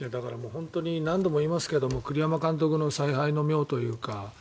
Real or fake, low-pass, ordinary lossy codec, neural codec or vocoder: real; none; none; none